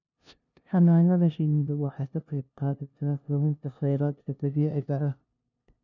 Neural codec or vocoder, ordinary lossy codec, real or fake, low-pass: codec, 16 kHz, 0.5 kbps, FunCodec, trained on LibriTTS, 25 frames a second; none; fake; 7.2 kHz